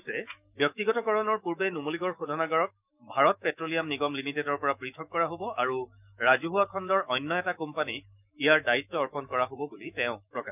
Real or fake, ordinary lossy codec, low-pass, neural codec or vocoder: fake; none; 3.6 kHz; autoencoder, 48 kHz, 128 numbers a frame, DAC-VAE, trained on Japanese speech